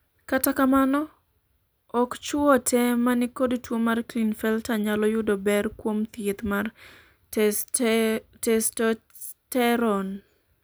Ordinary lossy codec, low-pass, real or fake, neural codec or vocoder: none; none; real; none